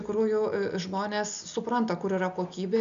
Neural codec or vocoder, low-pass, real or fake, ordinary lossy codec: none; 7.2 kHz; real; MP3, 96 kbps